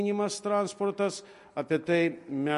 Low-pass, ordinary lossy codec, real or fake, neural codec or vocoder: 14.4 kHz; MP3, 48 kbps; real; none